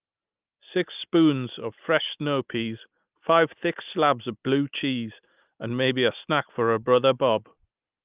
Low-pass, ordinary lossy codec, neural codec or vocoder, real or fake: 3.6 kHz; Opus, 24 kbps; none; real